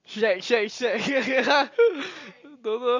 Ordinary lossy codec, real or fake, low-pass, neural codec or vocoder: MP3, 64 kbps; real; 7.2 kHz; none